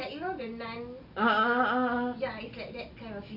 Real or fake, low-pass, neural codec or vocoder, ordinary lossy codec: real; 5.4 kHz; none; none